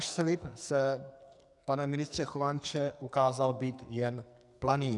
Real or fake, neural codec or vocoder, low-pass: fake; codec, 44.1 kHz, 2.6 kbps, SNAC; 10.8 kHz